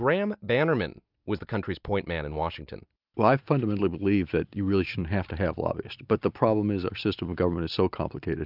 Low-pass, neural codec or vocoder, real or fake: 5.4 kHz; none; real